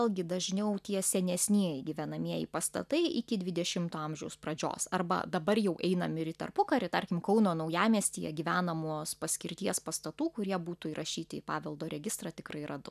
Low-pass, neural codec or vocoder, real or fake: 14.4 kHz; none; real